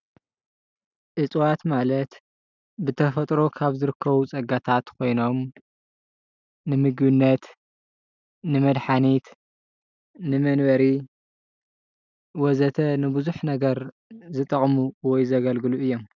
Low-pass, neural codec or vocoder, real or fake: 7.2 kHz; none; real